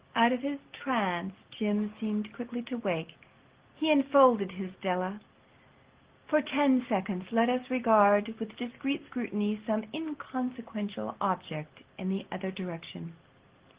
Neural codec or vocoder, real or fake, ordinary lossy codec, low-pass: none; real; Opus, 16 kbps; 3.6 kHz